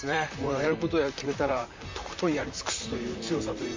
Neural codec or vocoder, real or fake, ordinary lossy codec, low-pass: vocoder, 44.1 kHz, 128 mel bands, Pupu-Vocoder; fake; MP3, 48 kbps; 7.2 kHz